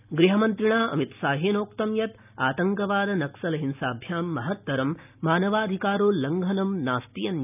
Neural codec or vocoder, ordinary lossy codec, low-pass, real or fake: none; none; 3.6 kHz; real